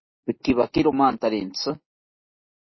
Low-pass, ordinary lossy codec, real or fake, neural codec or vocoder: 7.2 kHz; MP3, 24 kbps; real; none